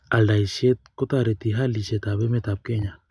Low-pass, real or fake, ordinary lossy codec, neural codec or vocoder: none; real; none; none